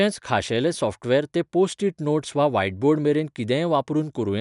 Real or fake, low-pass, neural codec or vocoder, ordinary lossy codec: real; 10.8 kHz; none; none